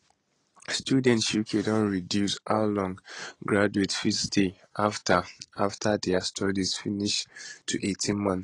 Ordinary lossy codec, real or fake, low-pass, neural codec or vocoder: AAC, 32 kbps; real; 10.8 kHz; none